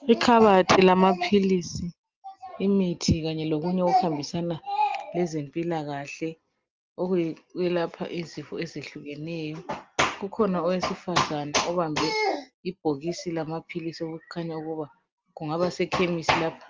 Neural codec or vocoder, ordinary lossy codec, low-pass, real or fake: none; Opus, 32 kbps; 7.2 kHz; real